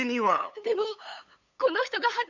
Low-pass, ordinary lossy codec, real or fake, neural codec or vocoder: 7.2 kHz; none; fake; codec, 16 kHz, 8 kbps, FunCodec, trained on LibriTTS, 25 frames a second